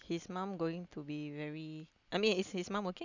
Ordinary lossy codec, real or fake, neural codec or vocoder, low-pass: none; real; none; 7.2 kHz